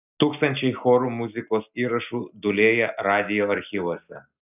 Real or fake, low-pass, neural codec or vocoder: real; 3.6 kHz; none